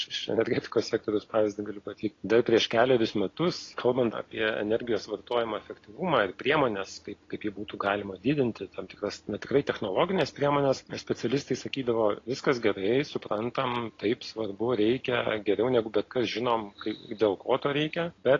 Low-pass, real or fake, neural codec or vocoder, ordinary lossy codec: 7.2 kHz; real; none; AAC, 32 kbps